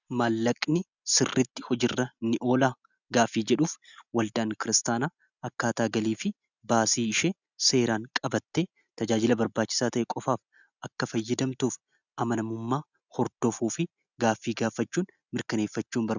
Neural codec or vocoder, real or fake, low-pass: none; real; 7.2 kHz